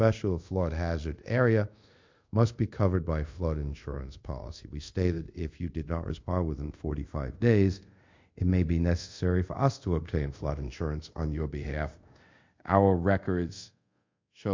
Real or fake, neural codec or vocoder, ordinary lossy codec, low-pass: fake; codec, 24 kHz, 0.5 kbps, DualCodec; MP3, 48 kbps; 7.2 kHz